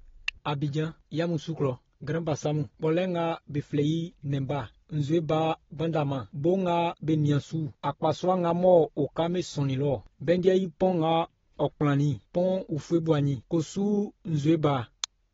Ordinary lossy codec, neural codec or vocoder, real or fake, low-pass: AAC, 24 kbps; none; real; 7.2 kHz